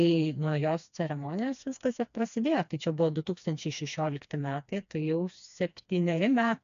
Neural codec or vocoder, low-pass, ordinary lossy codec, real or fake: codec, 16 kHz, 2 kbps, FreqCodec, smaller model; 7.2 kHz; MP3, 64 kbps; fake